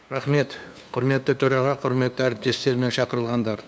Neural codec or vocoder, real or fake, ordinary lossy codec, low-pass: codec, 16 kHz, 2 kbps, FunCodec, trained on LibriTTS, 25 frames a second; fake; none; none